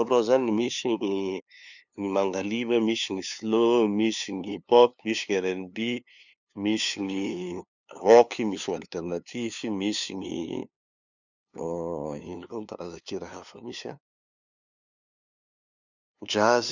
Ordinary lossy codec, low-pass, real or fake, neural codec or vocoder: none; 7.2 kHz; fake; codec, 16 kHz, 2 kbps, FunCodec, trained on LibriTTS, 25 frames a second